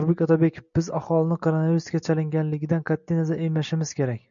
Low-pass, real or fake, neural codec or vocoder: 7.2 kHz; real; none